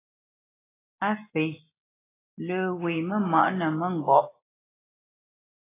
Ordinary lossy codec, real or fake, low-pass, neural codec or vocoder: AAC, 16 kbps; real; 3.6 kHz; none